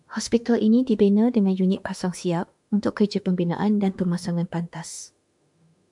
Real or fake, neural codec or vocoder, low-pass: fake; autoencoder, 48 kHz, 32 numbers a frame, DAC-VAE, trained on Japanese speech; 10.8 kHz